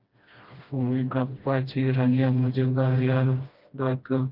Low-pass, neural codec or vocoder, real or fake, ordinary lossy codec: 5.4 kHz; codec, 16 kHz, 1 kbps, FreqCodec, smaller model; fake; Opus, 24 kbps